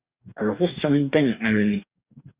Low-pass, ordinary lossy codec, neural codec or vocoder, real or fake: 3.6 kHz; Opus, 64 kbps; codec, 44.1 kHz, 2.6 kbps, DAC; fake